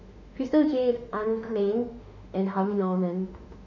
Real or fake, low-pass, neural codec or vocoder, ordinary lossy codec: fake; 7.2 kHz; autoencoder, 48 kHz, 32 numbers a frame, DAC-VAE, trained on Japanese speech; none